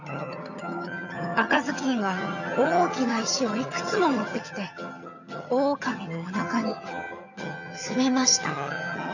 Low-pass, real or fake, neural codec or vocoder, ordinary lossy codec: 7.2 kHz; fake; vocoder, 22.05 kHz, 80 mel bands, HiFi-GAN; none